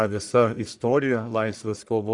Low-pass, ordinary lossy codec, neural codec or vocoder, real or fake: 10.8 kHz; Opus, 64 kbps; codec, 44.1 kHz, 1.7 kbps, Pupu-Codec; fake